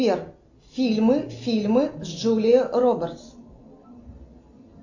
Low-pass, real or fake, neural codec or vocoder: 7.2 kHz; real; none